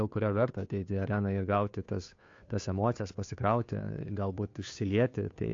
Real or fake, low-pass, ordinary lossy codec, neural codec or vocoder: fake; 7.2 kHz; AAC, 48 kbps; codec, 16 kHz, 2 kbps, FunCodec, trained on Chinese and English, 25 frames a second